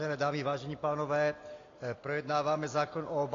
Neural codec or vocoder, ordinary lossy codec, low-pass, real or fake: none; AAC, 32 kbps; 7.2 kHz; real